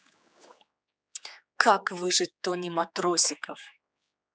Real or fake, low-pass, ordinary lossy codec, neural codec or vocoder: fake; none; none; codec, 16 kHz, 4 kbps, X-Codec, HuBERT features, trained on general audio